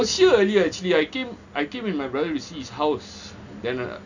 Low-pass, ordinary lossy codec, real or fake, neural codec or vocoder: 7.2 kHz; none; real; none